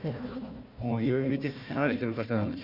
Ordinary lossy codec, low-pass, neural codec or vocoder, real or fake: MP3, 48 kbps; 5.4 kHz; codec, 16 kHz, 1 kbps, FunCodec, trained on Chinese and English, 50 frames a second; fake